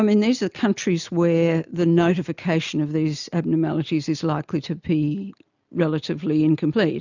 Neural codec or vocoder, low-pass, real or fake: none; 7.2 kHz; real